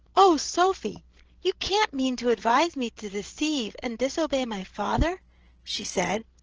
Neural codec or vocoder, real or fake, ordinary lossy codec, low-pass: codec, 16 kHz, 8 kbps, FreqCodec, larger model; fake; Opus, 16 kbps; 7.2 kHz